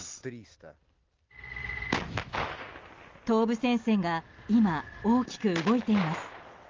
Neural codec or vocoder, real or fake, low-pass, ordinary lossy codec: none; real; 7.2 kHz; Opus, 32 kbps